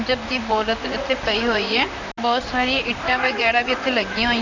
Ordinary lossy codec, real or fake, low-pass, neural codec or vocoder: none; fake; 7.2 kHz; vocoder, 44.1 kHz, 128 mel bands, Pupu-Vocoder